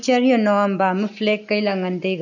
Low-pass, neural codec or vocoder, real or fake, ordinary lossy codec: 7.2 kHz; none; real; none